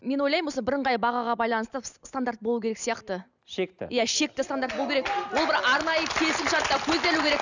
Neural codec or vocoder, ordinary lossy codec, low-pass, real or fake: none; none; 7.2 kHz; real